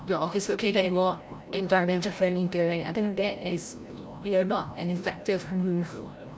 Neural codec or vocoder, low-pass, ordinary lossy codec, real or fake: codec, 16 kHz, 0.5 kbps, FreqCodec, larger model; none; none; fake